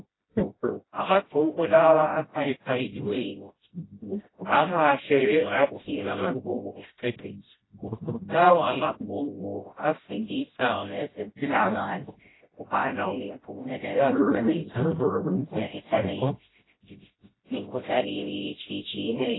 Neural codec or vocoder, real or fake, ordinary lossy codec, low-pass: codec, 16 kHz, 0.5 kbps, FreqCodec, smaller model; fake; AAC, 16 kbps; 7.2 kHz